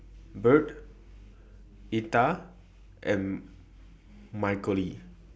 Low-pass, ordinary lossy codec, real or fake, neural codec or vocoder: none; none; real; none